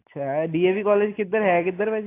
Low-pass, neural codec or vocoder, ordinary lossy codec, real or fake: 3.6 kHz; none; AAC, 24 kbps; real